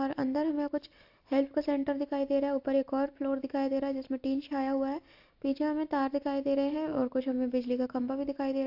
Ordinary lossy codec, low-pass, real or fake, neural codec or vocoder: AAC, 48 kbps; 5.4 kHz; real; none